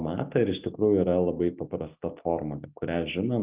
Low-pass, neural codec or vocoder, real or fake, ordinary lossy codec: 3.6 kHz; none; real; Opus, 24 kbps